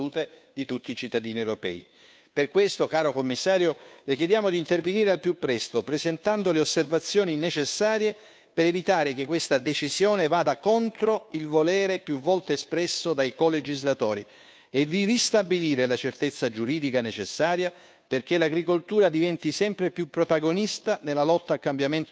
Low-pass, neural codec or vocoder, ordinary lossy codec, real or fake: none; codec, 16 kHz, 2 kbps, FunCodec, trained on Chinese and English, 25 frames a second; none; fake